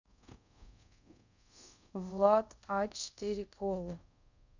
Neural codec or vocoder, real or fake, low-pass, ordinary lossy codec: codec, 24 kHz, 0.5 kbps, DualCodec; fake; 7.2 kHz; none